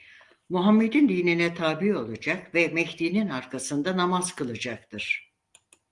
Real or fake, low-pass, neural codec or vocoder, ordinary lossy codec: real; 10.8 kHz; none; Opus, 24 kbps